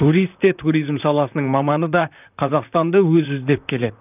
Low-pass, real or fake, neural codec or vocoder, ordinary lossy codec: 3.6 kHz; fake; vocoder, 44.1 kHz, 128 mel bands, Pupu-Vocoder; none